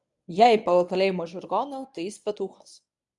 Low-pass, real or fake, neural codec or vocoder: 10.8 kHz; fake; codec, 24 kHz, 0.9 kbps, WavTokenizer, medium speech release version 1